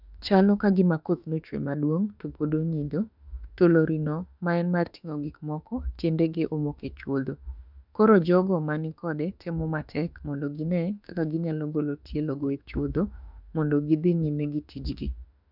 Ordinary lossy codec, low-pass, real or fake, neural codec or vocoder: none; 5.4 kHz; fake; autoencoder, 48 kHz, 32 numbers a frame, DAC-VAE, trained on Japanese speech